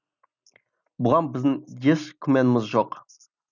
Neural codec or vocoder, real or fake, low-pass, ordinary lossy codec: none; real; 7.2 kHz; none